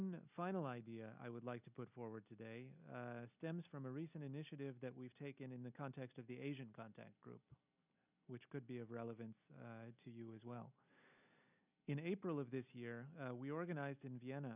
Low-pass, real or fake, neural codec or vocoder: 3.6 kHz; real; none